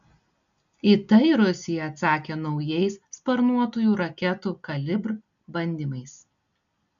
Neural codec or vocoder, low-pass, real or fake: none; 7.2 kHz; real